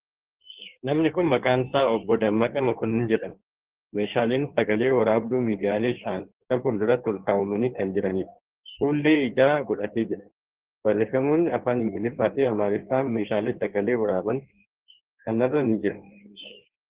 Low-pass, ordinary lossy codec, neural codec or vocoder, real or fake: 3.6 kHz; Opus, 16 kbps; codec, 16 kHz in and 24 kHz out, 1.1 kbps, FireRedTTS-2 codec; fake